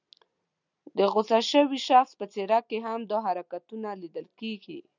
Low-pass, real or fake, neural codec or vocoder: 7.2 kHz; real; none